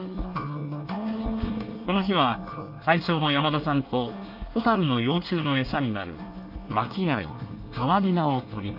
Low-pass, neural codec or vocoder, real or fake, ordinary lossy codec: 5.4 kHz; codec, 24 kHz, 1 kbps, SNAC; fake; none